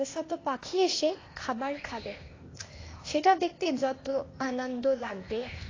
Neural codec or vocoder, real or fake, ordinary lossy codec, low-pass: codec, 16 kHz, 0.8 kbps, ZipCodec; fake; AAC, 32 kbps; 7.2 kHz